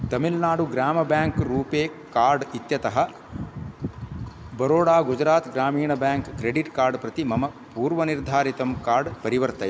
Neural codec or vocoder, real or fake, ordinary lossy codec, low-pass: none; real; none; none